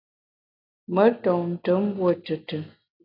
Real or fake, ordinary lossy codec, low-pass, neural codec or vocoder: real; AAC, 32 kbps; 5.4 kHz; none